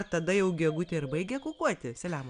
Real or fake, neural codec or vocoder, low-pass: real; none; 9.9 kHz